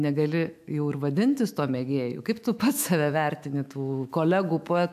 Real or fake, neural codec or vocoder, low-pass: fake; autoencoder, 48 kHz, 128 numbers a frame, DAC-VAE, trained on Japanese speech; 14.4 kHz